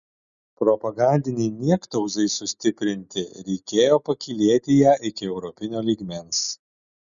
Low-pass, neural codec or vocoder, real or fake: 7.2 kHz; none; real